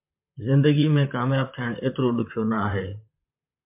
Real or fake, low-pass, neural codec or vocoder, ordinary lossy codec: fake; 3.6 kHz; vocoder, 44.1 kHz, 128 mel bands, Pupu-Vocoder; MP3, 32 kbps